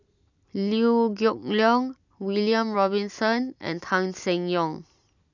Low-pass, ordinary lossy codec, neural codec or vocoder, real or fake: 7.2 kHz; none; none; real